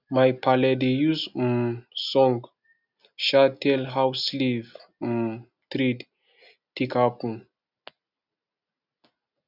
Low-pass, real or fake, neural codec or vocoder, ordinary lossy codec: 5.4 kHz; real; none; none